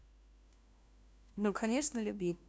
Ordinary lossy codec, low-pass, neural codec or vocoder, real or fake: none; none; codec, 16 kHz, 1 kbps, FunCodec, trained on LibriTTS, 50 frames a second; fake